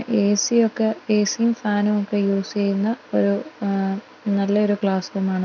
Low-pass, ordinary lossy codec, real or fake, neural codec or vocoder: 7.2 kHz; none; real; none